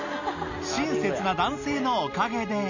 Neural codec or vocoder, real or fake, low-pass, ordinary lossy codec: none; real; 7.2 kHz; none